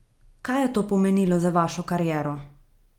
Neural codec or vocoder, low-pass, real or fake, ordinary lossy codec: none; 19.8 kHz; real; Opus, 24 kbps